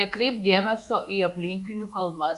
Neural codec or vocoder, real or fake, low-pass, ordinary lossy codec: codec, 24 kHz, 1.2 kbps, DualCodec; fake; 10.8 kHz; Opus, 64 kbps